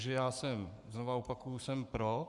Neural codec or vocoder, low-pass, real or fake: codec, 44.1 kHz, 7.8 kbps, Pupu-Codec; 10.8 kHz; fake